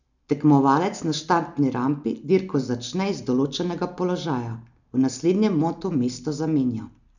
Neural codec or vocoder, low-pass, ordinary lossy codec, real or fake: none; 7.2 kHz; none; real